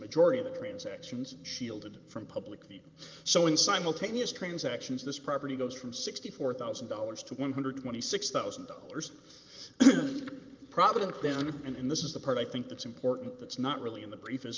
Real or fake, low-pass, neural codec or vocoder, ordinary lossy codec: fake; 7.2 kHz; vocoder, 44.1 kHz, 128 mel bands every 512 samples, BigVGAN v2; Opus, 24 kbps